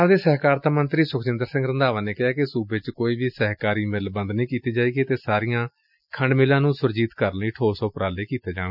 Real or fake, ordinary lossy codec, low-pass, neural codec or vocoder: real; none; 5.4 kHz; none